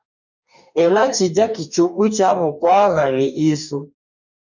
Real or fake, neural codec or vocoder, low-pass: fake; codec, 44.1 kHz, 2.6 kbps, DAC; 7.2 kHz